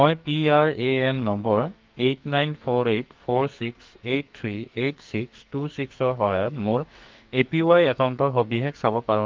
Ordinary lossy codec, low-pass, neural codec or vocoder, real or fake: Opus, 24 kbps; 7.2 kHz; codec, 44.1 kHz, 2.6 kbps, SNAC; fake